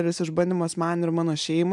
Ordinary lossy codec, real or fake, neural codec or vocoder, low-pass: MP3, 96 kbps; real; none; 10.8 kHz